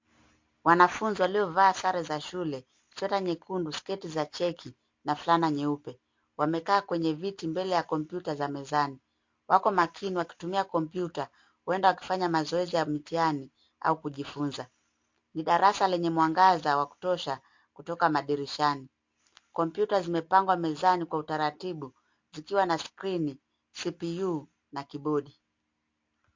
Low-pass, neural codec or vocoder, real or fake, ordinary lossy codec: 7.2 kHz; none; real; MP3, 48 kbps